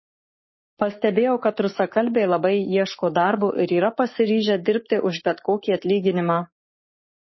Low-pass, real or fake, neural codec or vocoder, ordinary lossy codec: 7.2 kHz; fake; codec, 44.1 kHz, 7.8 kbps, Pupu-Codec; MP3, 24 kbps